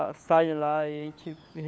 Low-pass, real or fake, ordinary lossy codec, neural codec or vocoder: none; fake; none; codec, 16 kHz, 4 kbps, FunCodec, trained on LibriTTS, 50 frames a second